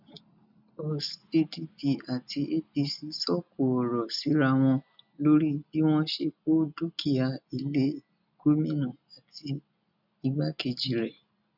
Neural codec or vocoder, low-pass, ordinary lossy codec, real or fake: none; 5.4 kHz; none; real